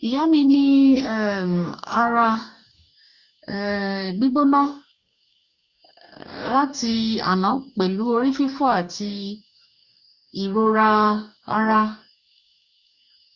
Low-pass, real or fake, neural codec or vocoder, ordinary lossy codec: 7.2 kHz; fake; codec, 44.1 kHz, 2.6 kbps, DAC; Opus, 64 kbps